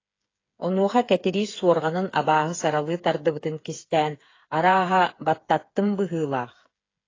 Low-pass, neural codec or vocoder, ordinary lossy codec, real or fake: 7.2 kHz; codec, 16 kHz, 8 kbps, FreqCodec, smaller model; AAC, 32 kbps; fake